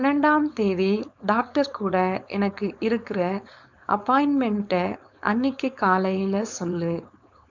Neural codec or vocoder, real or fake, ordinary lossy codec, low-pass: codec, 16 kHz, 4.8 kbps, FACodec; fake; none; 7.2 kHz